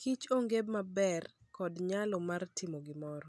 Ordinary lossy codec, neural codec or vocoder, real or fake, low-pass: none; none; real; none